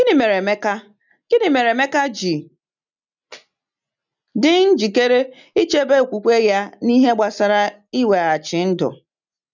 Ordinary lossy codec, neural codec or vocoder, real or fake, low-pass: none; none; real; 7.2 kHz